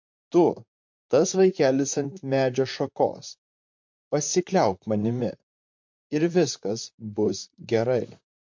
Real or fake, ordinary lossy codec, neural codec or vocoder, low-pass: fake; MP3, 48 kbps; vocoder, 44.1 kHz, 128 mel bands, Pupu-Vocoder; 7.2 kHz